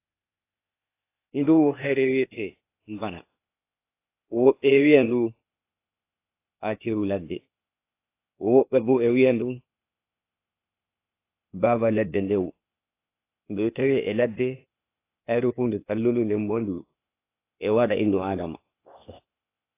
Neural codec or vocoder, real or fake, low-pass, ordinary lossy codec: codec, 16 kHz, 0.8 kbps, ZipCodec; fake; 3.6 kHz; AAC, 24 kbps